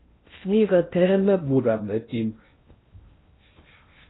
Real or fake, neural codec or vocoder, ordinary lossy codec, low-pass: fake; codec, 16 kHz in and 24 kHz out, 0.6 kbps, FocalCodec, streaming, 2048 codes; AAC, 16 kbps; 7.2 kHz